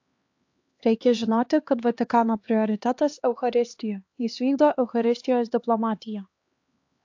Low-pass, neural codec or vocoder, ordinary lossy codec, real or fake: 7.2 kHz; codec, 16 kHz, 2 kbps, X-Codec, HuBERT features, trained on LibriSpeech; AAC, 48 kbps; fake